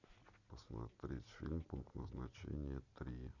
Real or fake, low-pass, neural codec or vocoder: real; 7.2 kHz; none